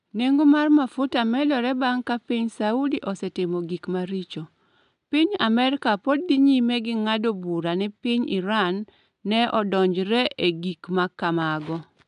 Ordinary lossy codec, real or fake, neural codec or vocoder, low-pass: none; real; none; 10.8 kHz